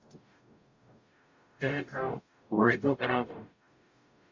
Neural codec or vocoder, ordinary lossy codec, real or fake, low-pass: codec, 44.1 kHz, 0.9 kbps, DAC; MP3, 64 kbps; fake; 7.2 kHz